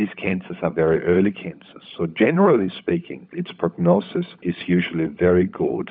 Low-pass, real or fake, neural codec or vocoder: 5.4 kHz; fake; codec, 16 kHz, 16 kbps, FunCodec, trained on LibriTTS, 50 frames a second